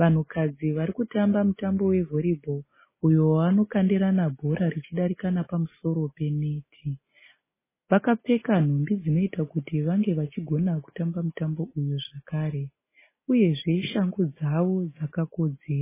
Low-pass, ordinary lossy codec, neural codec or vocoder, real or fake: 3.6 kHz; MP3, 16 kbps; none; real